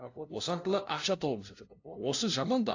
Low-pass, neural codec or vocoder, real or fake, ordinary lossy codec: 7.2 kHz; codec, 16 kHz, 0.5 kbps, FunCodec, trained on LibriTTS, 25 frames a second; fake; AAC, 48 kbps